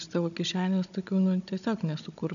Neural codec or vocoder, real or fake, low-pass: codec, 16 kHz, 16 kbps, FunCodec, trained on Chinese and English, 50 frames a second; fake; 7.2 kHz